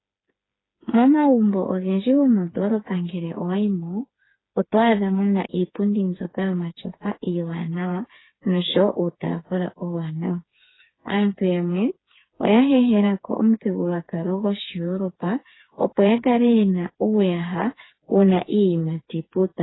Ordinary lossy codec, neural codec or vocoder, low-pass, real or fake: AAC, 16 kbps; codec, 16 kHz, 4 kbps, FreqCodec, smaller model; 7.2 kHz; fake